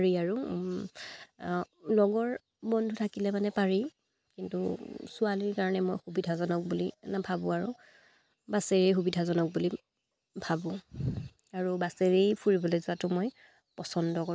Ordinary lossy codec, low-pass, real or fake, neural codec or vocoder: none; none; real; none